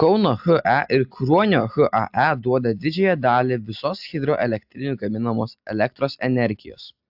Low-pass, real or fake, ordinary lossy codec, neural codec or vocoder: 5.4 kHz; real; AAC, 48 kbps; none